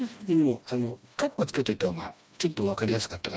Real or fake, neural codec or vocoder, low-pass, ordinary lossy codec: fake; codec, 16 kHz, 1 kbps, FreqCodec, smaller model; none; none